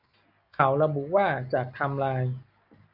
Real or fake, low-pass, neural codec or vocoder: real; 5.4 kHz; none